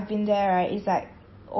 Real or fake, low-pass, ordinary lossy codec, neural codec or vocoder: real; 7.2 kHz; MP3, 24 kbps; none